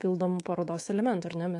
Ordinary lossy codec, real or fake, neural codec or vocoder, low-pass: AAC, 48 kbps; fake; codec, 24 kHz, 3.1 kbps, DualCodec; 10.8 kHz